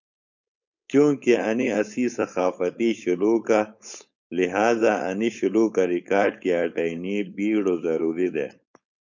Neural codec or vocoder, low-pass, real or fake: codec, 16 kHz, 4.8 kbps, FACodec; 7.2 kHz; fake